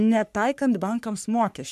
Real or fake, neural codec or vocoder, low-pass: fake; codec, 44.1 kHz, 3.4 kbps, Pupu-Codec; 14.4 kHz